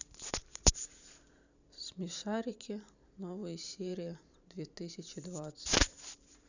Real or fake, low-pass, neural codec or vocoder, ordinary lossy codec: fake; 7.2 kHz; vocoder, 44.1 kHz, 128 mel bands every 512 samples, BigVGAN v2; none